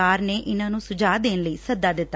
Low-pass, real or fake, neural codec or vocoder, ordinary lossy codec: none; real; none; none